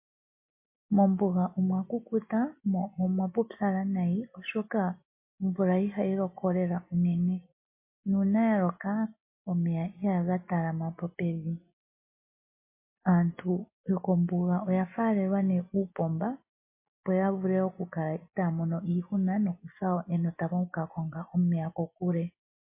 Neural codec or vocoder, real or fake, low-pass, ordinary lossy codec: none; real; 3.6 kHz; MP3, 24 kbps